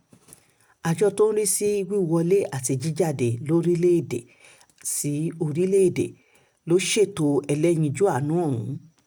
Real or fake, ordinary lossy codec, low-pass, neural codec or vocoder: real; none; none; none